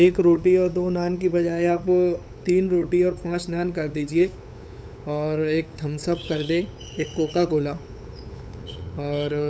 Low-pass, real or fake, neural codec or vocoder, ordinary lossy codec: none; fake; codec, 16 kHz, 8 kbps, FunCodec, trained on LibriTTS, 25 frames a second; none